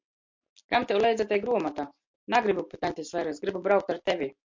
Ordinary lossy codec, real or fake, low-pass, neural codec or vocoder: MP3, 48 kbps; real; 7.2 kHz; none